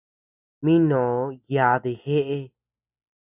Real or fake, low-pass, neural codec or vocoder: real; 3.6 kHz; none